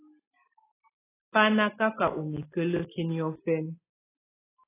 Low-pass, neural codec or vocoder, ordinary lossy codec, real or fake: 3.6 kHz; none; AAC, 16 kbps; real